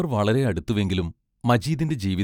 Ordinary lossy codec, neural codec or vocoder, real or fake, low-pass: none; none; real; 19.8 kHz